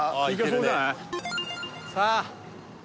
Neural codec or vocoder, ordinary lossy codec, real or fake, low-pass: none; none; real; none